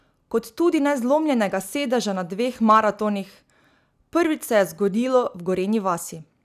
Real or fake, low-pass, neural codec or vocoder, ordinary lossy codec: real; 14.4 kHz; none; none